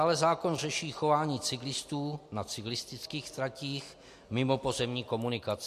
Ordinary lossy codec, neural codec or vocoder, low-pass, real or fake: MP3, 64 kbps; none; 14.4 kHz; real